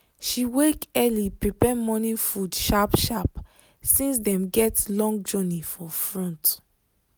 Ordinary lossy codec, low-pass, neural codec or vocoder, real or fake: none; none; none; real